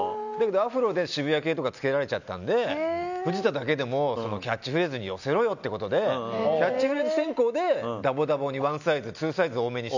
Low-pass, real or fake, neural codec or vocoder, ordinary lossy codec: 7.2 kHz; real; none; none